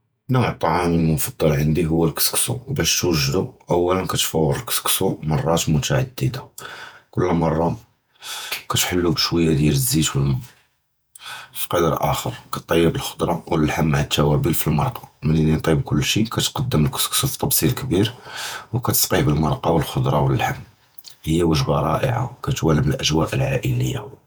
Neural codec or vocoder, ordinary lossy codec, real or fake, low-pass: vocoder, 48 kHz, 128 mel bands, Vocos; none; fake; none